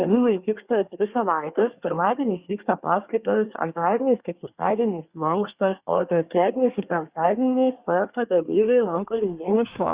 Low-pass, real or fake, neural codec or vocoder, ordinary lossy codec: 3.6 kHz; fake; codec, 24 kHz, 1 kbps, SNAC; Opus, 64 kbps